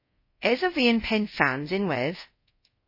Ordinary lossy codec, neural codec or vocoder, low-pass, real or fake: MP3, 24 kbps; codec, 24 kHz, 0.5 kbps, DualCodec; 5.4 kHz; fake